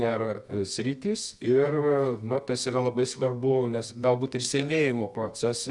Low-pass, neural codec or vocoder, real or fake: 10.8 kHz; codec, 24 kHz, 0.9 kbps, WavTokenizer, medium music audio release; fake